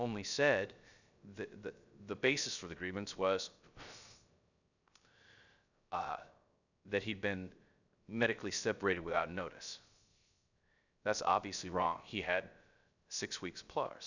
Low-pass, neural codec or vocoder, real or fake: 7.2 kHz; codec, 16 kHz, 0.3 kbps, FocalCodec; fake